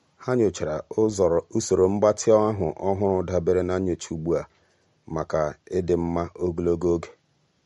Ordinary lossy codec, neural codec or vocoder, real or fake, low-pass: MP3, 48 kbps; none; real; 19.8 kHz